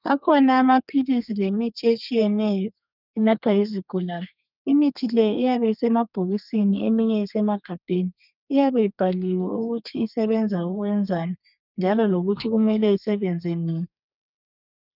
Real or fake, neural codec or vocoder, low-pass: fake; codec, 32 kHz, 1.9 kbps, SNAC; 5.4 kHz